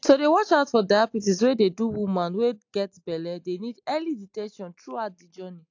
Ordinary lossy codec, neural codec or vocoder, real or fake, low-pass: AAC, 48 kbps; none; real; 7.2 kHz